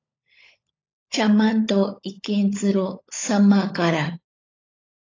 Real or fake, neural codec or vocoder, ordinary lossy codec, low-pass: fake; codec, 16 kHz, 16 kbps, FunCodec, trained on LibriTTS, 50 frames a second; AAC, 32 kbps; 7.2 kHz